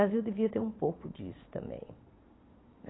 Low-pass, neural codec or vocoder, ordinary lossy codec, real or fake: 7.2 kHz; vocoder, 22.05 kHz, 80 mel bands, Vocos; AAC, 16 kbps; fake